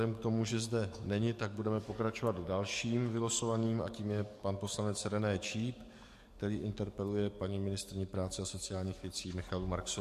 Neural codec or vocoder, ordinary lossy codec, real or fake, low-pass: codec, 44.1 kHz, 7.8 kbps, DAC; MP3, 64 kbps; fake; 14.4 kHz